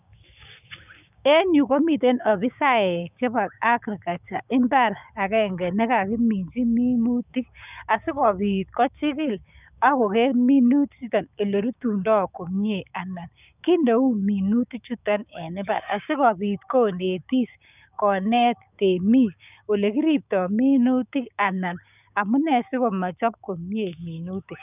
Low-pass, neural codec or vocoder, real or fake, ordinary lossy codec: 3.6 kHz; codec, 16 kHz, 6 kbps, DAC; fake; none